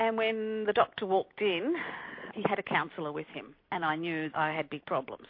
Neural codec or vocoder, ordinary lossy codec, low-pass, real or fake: none; MP3, 32 kbps; 5.4 kHz; real